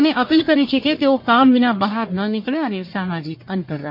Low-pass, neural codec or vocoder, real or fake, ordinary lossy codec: 5.4 kHz; codec, 44.1 kHz, 1.7 kbps, Pupu-Codec; fake; MP3, 32 kbps